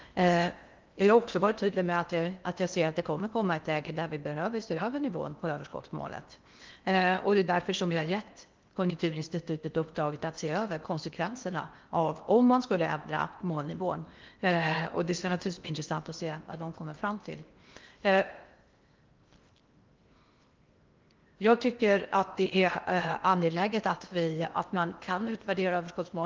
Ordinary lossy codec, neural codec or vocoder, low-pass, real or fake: Opus, 32 kbps; codec, 16 kHz in and 24 kHz out, 0.8 kbps, FocalCodec, streaming, 65536 codes; 7.2 kHz; fake